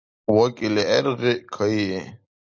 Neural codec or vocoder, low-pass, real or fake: none; 7.2 kHz; real